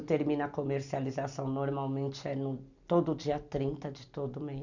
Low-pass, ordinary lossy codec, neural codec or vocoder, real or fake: 7.2 kHz; none; none; real